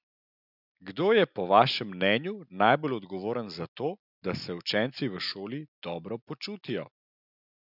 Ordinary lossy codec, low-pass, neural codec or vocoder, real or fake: none; 5.4 kHz; none; real